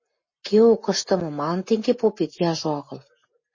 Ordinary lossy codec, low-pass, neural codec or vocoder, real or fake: MP3, 32 kbps; 7.2 kHz; none; real